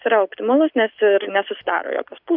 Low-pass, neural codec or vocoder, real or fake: 5.4 kHz; none; real